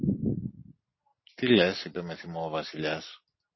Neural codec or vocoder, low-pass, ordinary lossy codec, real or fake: none; 7.2 kHz; MP3, 24 kbps; real